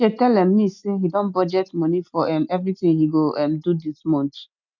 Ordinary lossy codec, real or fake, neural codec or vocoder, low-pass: none; real; none; 7.2 kHz